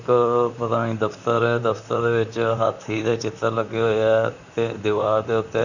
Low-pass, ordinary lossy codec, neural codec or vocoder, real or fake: 7.2 kHz; none; vocoder, 44.1 kHz, 128 mel bands, Pupu-Vocoder; fake